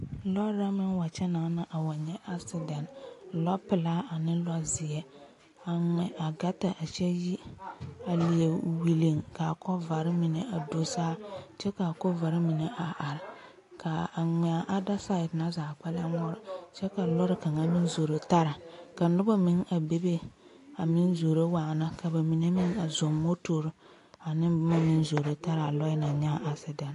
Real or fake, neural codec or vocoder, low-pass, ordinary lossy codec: real; none; 10.8 kHz; AAC, 48 kbps